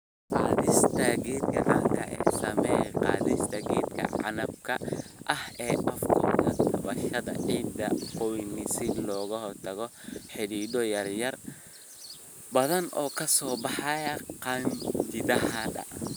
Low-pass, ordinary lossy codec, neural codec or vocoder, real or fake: none; none; none; real